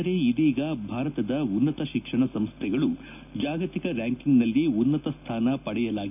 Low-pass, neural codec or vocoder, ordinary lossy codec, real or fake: 3.6 kHz; none; none; real